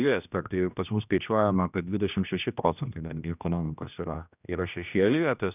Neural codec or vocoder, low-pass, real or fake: codec, 16 kHz, 1 kbps, X-Codec, HuBERT features, trained on general audio; 3.6 kHz; fake